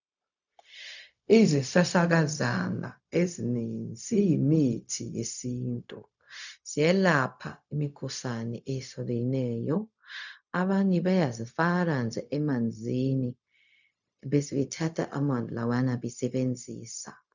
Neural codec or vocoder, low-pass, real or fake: codec, 16 kHz, 0.4 kbps, LongCat-Audio-Codec; 7.2 kHz; fake